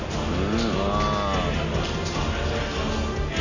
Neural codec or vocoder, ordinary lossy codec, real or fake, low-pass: none; none; real; 7.2 kHz